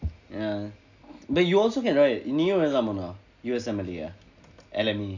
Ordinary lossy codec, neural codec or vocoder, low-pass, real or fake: none; none; 7.2 kHz; real